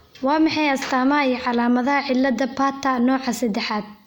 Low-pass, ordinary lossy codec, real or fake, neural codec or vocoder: 19.8 kHz; none; real; none